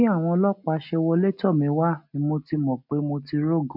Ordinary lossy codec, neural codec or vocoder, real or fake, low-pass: none; none; real; 5.4 kHz